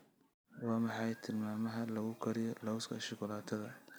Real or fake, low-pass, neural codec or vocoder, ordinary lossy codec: real; none; none; none